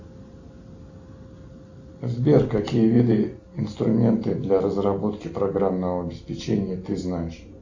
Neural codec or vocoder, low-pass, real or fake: none; 7.2 kHz; real